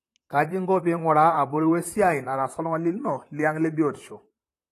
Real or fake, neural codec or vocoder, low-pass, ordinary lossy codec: fake; vocoder, 44.1 kHz, 128 mel bands, Pupu-Vocoder; 14.4 kHz; AAC, 48 kbps